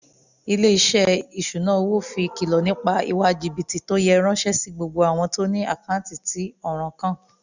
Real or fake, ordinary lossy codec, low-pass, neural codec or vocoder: real; none; 7.2 kHz; none